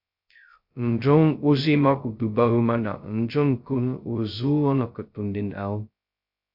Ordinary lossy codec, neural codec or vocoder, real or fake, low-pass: MP3, 48 kbps; codec, 16 kHz, 0.2 kbps, FocalCodec; fake; 5.4 kHz